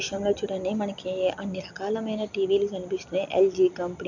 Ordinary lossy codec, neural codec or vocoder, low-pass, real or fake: none; none; 7.2 kHz; real